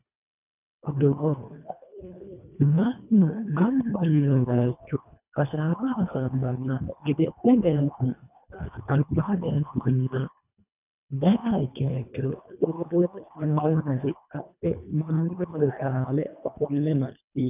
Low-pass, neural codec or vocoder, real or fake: 3.6 kHz; codec, 24 kHz, 1.5 kbps, HILCodec; fake